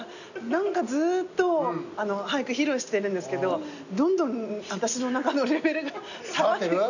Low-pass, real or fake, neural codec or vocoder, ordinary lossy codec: 7.2 kHz; real; none; none